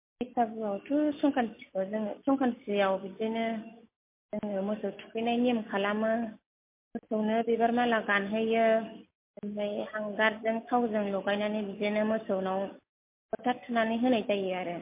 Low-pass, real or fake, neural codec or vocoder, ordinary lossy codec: 3.6 kHz; real; none; MP3, 24 kbps